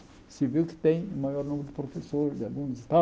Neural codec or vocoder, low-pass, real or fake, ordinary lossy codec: none; none; real; none